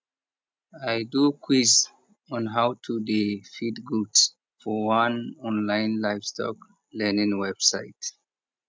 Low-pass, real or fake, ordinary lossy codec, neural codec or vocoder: none; real; none; none